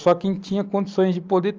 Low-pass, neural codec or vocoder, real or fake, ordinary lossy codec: 7.2 kHz; none; real; Opus, 24 kbps